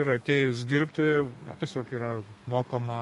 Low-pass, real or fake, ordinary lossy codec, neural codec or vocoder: 14.4 kHz; fake; MP3, 48 kbps; codec, 32 kHz, 1.9 kbps, SNAC